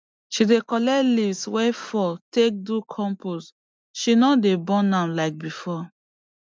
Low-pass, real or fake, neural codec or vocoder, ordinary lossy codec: none; real; none; none